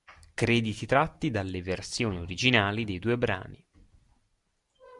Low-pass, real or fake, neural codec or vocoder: 10.8 kHz; real; none